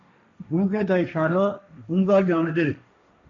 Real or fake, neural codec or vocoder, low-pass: fake; codec, 16 kHz, 1.1 kbps, Voila-Tokenizer; 7.2 kHz